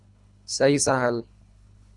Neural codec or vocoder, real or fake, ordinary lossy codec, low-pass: codec, 24 kHz, 3 kbps, HILCodec; fake; Opus, 64 kbps; 10.8 kHz